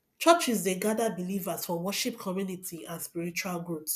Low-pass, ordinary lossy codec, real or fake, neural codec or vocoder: 14.4 kHz; none; real; none